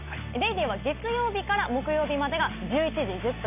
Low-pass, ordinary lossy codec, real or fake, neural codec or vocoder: 3.6 kHz; none; real; none